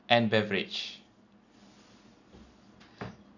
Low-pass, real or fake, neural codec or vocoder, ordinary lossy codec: 7.2 kHz; real; none; none